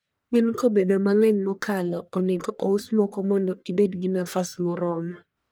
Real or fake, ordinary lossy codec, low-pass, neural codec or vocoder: fake; none; none; codec, 44.1 kHz, 1.7 kbps, Pupu-Codec